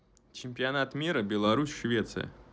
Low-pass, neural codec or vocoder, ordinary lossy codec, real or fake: none; none; none; real